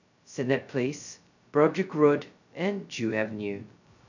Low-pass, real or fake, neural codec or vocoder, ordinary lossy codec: 7.2 kHz; fake; codec, 16 kHz, 0.2 kbps, FocalCodec; none